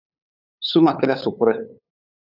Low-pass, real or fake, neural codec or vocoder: 5.4 kHz; fake; codec, 16 kHz, 8 kbps, FunCodec, trained on LibriTTS, 25 frames a second